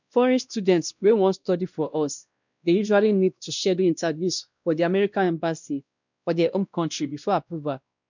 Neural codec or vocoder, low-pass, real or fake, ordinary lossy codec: codec, 16 kHz, 1 kbps, X-Codec, WavLM features, trained on Multilingual LibriSpeech; 7.2 kHz; fake; none